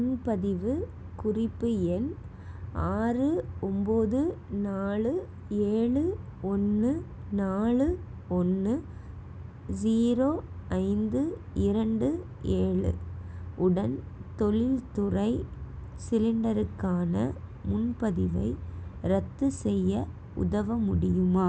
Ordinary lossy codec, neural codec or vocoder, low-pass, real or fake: none; none; none; real